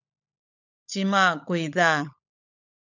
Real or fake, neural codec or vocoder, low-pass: fake; codec, 16 kHz, 16 kbps, FunCodec, trained on LibriTTS, 50 frames a second; 7.2 kHz